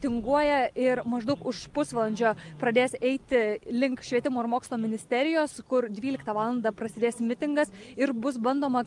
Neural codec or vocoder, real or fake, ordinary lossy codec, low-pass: none; real; Opus, 32 kbps; 10.8 kHz